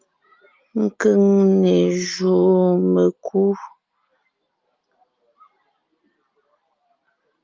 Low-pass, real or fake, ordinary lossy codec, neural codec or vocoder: 7.2 kHz; real; Opus, 32 kbps; none